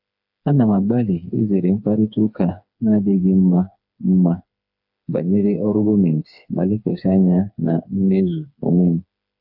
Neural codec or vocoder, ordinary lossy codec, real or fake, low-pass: codec, 16 kHz, 4 kbps, FreqCodec, smaller model; AAC, 48 kbps; fake; 5.4 kHz